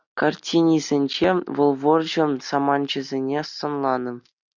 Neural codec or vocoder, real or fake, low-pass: none; real; 7.2 kHz